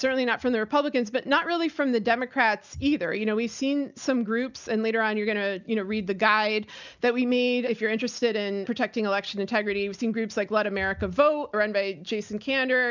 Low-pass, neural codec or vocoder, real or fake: 7.2 kHz; none; real